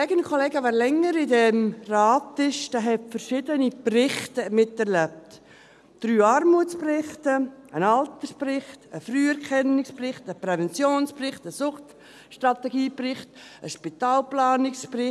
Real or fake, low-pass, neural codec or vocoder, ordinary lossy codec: real; none; none; none